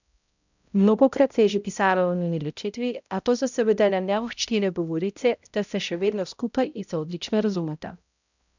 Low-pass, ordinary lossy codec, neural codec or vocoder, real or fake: 7.2 kHz; none; codec, 16 kHz, 0.5 kbps, X-Codec, HuBERT features, trained on balanced general audio; fake